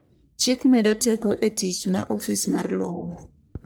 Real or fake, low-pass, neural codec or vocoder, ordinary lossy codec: fake; none; codec, 44.1 kHz, 1.7 kbps, Pupu-Codec; none